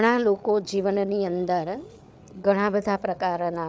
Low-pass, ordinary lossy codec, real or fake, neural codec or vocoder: none; none; fake; codec, 16 kHz, 8 kbps, FreqCodec, larger model